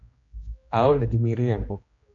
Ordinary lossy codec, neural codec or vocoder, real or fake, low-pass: MP3, 48 kbps; codec, 16 kHz, 1 kbps, X-Codec, HuBERT features, trained on general audio; fake; 7.2 kHz